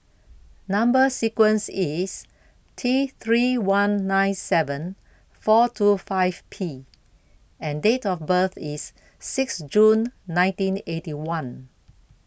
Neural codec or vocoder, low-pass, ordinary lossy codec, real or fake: none; none; none; real